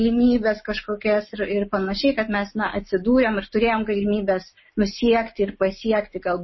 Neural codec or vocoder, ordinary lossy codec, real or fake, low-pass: none; MP3, 24 kbps; real; 7.2 kHz